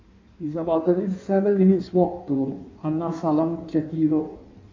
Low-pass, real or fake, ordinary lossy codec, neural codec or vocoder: 7.2 kHz; fake; MP3, 48 kbps; codec, 16 kHz in and 24 kHz out, 1.1 kbps, FireRedTTS-2 codec